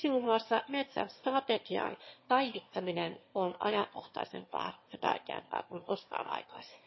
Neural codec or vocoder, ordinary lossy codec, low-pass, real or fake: autoencoder, 22.05 kHz, a latent of 192 numbers a frame, VITS, trained on one speaker; MP3, 24 kbps; 7.2 kHz; fake